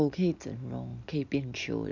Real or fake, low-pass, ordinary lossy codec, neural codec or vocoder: real; 7.2 kHz; none; none